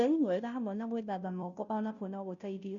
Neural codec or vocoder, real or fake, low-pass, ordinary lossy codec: codec, 16 kHz, 0.5 kbps, FunCodec, trained on Chinese and English, 25 frames a second; fake; 7.2 kHz; none